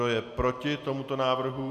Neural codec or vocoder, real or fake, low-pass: none; real; 14.4 kHz